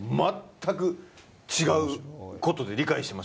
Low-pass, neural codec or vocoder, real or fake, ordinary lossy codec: none; none; real; none